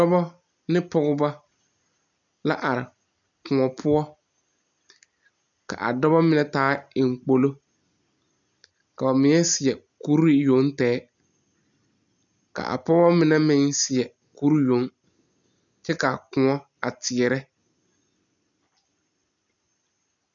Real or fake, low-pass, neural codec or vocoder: real; 7.2 kHz; none